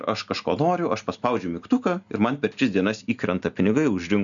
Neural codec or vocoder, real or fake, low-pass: none; real; 7.2 kHz